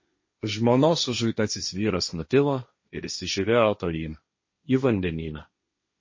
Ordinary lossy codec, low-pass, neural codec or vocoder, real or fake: MP3, 32 kbps; 7.2 kHz; codec, 16 kHz, 1.1 kbps, Voila-Tokenizer; fake